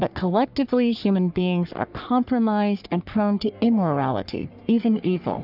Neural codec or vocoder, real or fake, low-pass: codec, 44.1 kHz, 3.4 kbps, Pupu-Codec; fake; 5.4 kHz